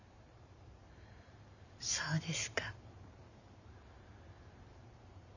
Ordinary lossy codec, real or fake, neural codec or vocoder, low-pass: none; fake; vocoder, 44.1 kHz, 128 mel bands every 512 samples, BigVGAN v2; 7.2 kHz